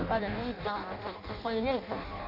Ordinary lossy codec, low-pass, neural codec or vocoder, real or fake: none; 5.4 kHz; codec, 16 kHz in and 24 kHz out, 0.6 kbps, FireRedTTS-2 codec; fake